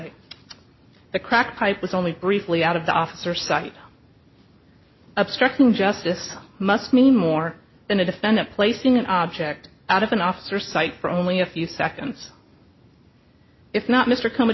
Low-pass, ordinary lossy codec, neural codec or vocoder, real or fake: 7.2 kHz; MP3, 24 kbps; none; real